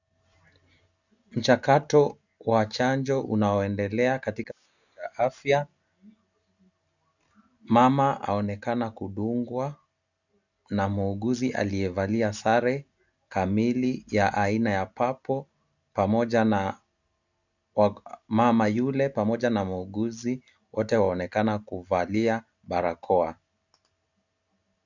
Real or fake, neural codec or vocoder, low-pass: real; none; 7.2 kHz